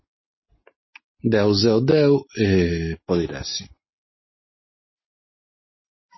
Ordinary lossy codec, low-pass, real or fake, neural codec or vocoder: MP3, 24 kbps; 7.2 kHz; real; none